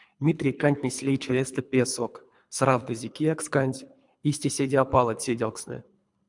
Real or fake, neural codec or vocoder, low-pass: fake; codec, 24 kHz, 3 kbps, HILCodec; 10.8 kHz